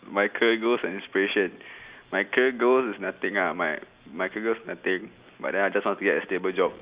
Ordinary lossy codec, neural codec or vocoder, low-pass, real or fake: Opus, 64 kbps; none; 3.6 kHz; real